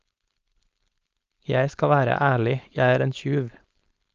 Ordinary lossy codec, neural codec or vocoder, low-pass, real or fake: Opus, 24 kbps; codec, 16 kHz, 4.8 kbps, FACodec; 7.2 kHz; fake